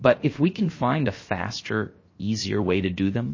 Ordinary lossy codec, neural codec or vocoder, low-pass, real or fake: MP3, 32 kbps; codec, 16 kHz, 0.7 kbps, FocalCodec; 7.2 kHz; fake